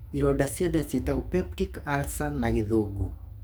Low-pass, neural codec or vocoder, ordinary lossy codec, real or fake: none; codec, 44.1 kHz, 2.6 kbps, SNAC; none; fake